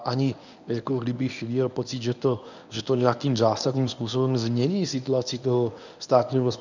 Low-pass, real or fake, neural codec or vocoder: 7.2 kHz; fake; codec, 24 kHz, 0.9 kbps, WavTokenizer, medium speech release version 1